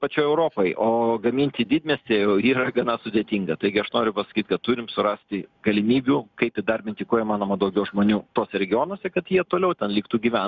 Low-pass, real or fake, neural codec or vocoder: 7.2 kHz; real; none